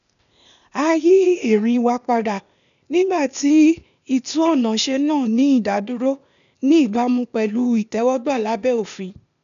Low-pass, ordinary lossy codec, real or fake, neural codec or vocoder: 7.2 kHz; none; fake; codec, 16 kHz, 0.8 kbps, ZipCodec